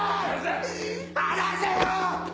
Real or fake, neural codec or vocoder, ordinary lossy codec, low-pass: real; none; none; none